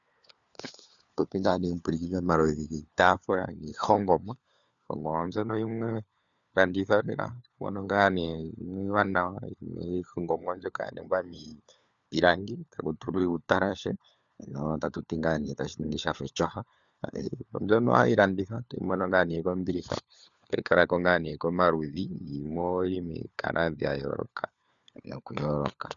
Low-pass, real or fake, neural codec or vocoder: 7.2 kHz; fake; codec, 16 kHz, 4 kbps, FunCodec, trained on LibriTTS, 50 frames a second